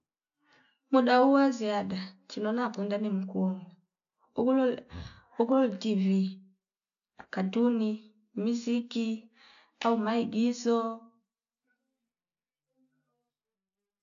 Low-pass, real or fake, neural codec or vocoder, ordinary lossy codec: 7.2 kHz; real; none; none